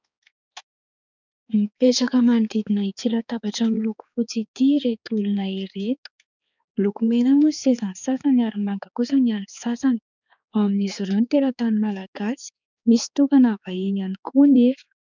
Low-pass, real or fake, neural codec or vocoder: 7.2 kHz; fake; codec, 16 kHz, 4 kbps, X-Codec, HuBERT features, trained on balanced general audio